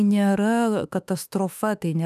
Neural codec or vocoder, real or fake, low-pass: autoencoder, 48 kHz, 32 numbers a frame, DAC-VAE, trained on Japanese speech; fake; 14.4 kHz